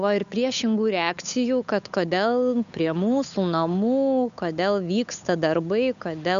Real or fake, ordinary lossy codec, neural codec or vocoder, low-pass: fake; MP3, 96 kbps; codec, 16 kHz, 8 kbps, FunCodec, trained on Chinese and English, 25 frames a second; 7.2 kHz